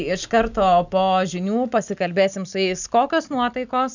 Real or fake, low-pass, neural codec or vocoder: real; 7.2 kHz; none